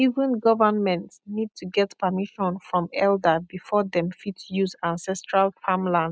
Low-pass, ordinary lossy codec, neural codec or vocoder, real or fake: none; none; none; real